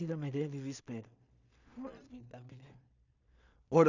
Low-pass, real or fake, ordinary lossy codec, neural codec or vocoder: 7.2 kHz; fake; none; codec, 16 kHz in and 24 kHz out, 0.4 kbps, LongCat-Audio-Codec, two codebook decoder